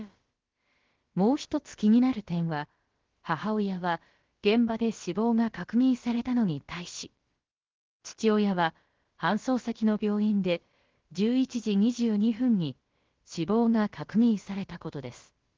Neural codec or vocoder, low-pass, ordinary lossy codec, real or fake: codec, 16 kHz, about 1 kbps, DyCAST, with the encoder's durations; 7.2 kHz; Opus, 16 kbps; fake